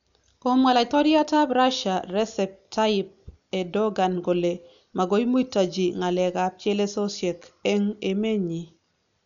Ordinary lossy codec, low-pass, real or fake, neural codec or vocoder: none; 7.2 kHz; real; none